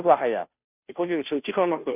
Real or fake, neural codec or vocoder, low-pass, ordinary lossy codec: fake; codec, 16 kHz, 0.5 kbps, FunCodec, trained on Chinese and English, 25 frames a second; 3.6 kHz; none